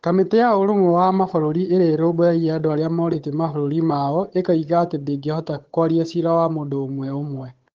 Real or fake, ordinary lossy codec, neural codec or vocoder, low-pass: fake; Opus, 16 kbps; codec, 16 kHz, 8 kbps, FunCodec, trained on Chinese and English, 25 frames a second; 7.2 kHz